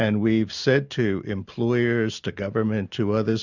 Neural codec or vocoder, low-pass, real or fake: none; 7.2 kHz; real